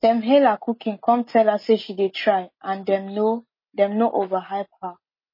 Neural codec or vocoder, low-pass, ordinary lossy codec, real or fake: none; 5.4 kHz; MP3, 24 kbps; real